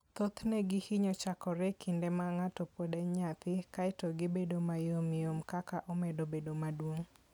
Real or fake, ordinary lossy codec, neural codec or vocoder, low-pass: real; none; none; none